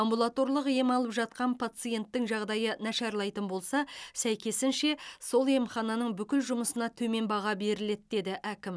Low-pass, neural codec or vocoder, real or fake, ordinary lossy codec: none; none; real; none